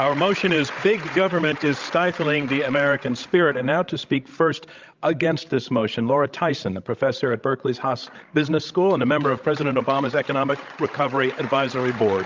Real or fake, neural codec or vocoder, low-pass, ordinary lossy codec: fake; codec, 16 kHz, 16 kbps, FreqCodec, larger model; 7.2 kHz; Opus, 32 kbps